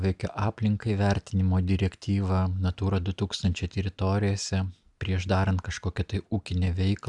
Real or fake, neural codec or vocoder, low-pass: real; none; 10.8 kHz